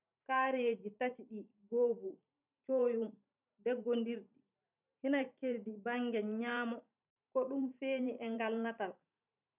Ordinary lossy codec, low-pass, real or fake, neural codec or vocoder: none; 3.6 kHz; fake; vocoder, 44.1 kHz, 128 mel bands every 512 samples, BigVGAN v2